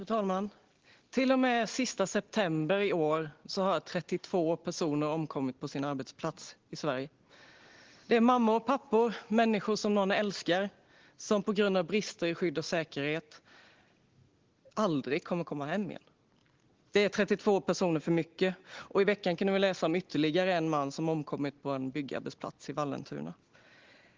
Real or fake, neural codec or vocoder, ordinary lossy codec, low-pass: real; none; Opus, 16 kbps; 7.2 kHz